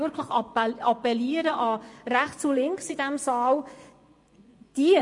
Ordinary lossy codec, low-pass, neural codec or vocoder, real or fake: MP3, 48 kbps; 10.8 kHz; vocoder, 24 kHz, 100 mel bands, Vocos; fake